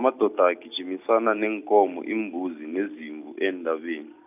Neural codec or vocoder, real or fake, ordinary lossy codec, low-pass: none; real; none; 3.6 kHz